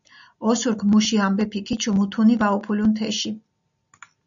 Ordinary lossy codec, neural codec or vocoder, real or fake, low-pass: MP3, 96 kbps; none; real; 7.2 kHz